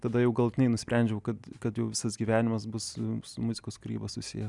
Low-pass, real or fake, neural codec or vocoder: 10.8 kHz; real; none